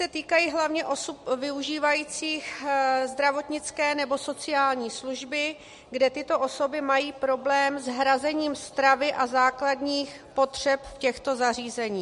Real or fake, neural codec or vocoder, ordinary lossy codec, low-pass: real; none; MP3, 48 kbps; 14.4 kHz